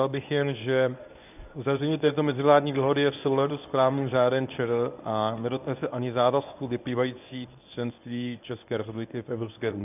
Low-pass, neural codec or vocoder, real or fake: 3.6 kHz; codec, 24 kHz, 0.9 kbps, WavTokenizer, medium speech release version 1; fake